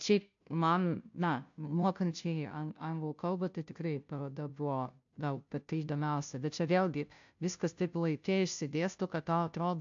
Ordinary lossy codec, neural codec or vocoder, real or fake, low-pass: AAC, 64 kbps; codec, 16 kHz, 0.5 kbps, FunCodec, trained on Chinese and English, 25 frames a second; fake; 7.2 kHz